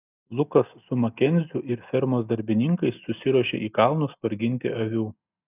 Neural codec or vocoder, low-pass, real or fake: none; 3.6 kHz; real